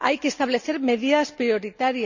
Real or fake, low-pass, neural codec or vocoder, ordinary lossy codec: real; 7.2 kHz; none; none